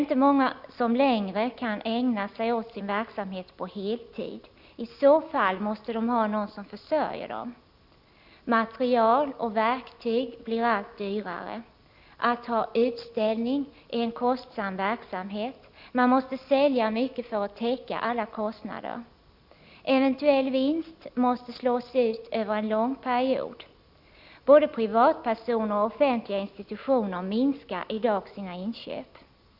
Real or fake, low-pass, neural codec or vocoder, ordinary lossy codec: real; 5.4 kHz; none; Opus, 64 kbps